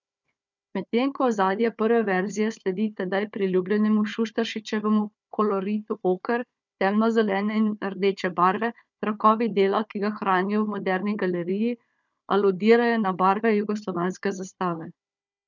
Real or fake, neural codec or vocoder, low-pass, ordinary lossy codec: fake; codec, 16 kHz, 4 kbps, FunCodec, trained on Chinese and English, 50 frames a second; 7.2 kHz; none